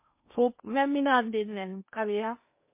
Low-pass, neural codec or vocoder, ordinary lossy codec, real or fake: 3.6 kHz; codec, 16 kHz in and 24 kHz out, 0.8 kbps, FocalCodec, streaming, 65536 codes; MP3, 24 kbps; fake